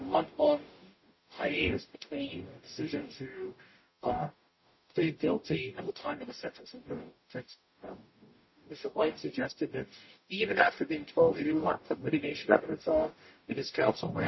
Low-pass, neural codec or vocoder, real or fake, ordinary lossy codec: 7.2 kHz; codec, 44.1 kHz, 0.9 kbps, DAC; fake; MP3, 24 kbps